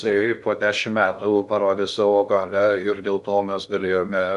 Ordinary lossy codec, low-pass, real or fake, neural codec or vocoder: MP3, 96 kbps; 10.8 kHz; fake; codec, 16 kHz in and 24 kHz out, 0.6 kbps, FocalCodec, streaming, 2048 codes